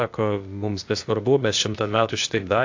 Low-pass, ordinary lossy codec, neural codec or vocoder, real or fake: 7.2 kHz; AAC, 48 kbps; codec, 16 kHz, 0.8 kbps, ZipCodec; fake